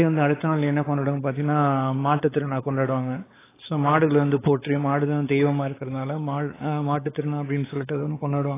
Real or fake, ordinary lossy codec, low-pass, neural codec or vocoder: fake; AAC, 16 kbps; 3.6 kHz; codec, 16 kHz, 4 kbps, X-Codec, WavLM features, trained on Multilingual LibriSpeech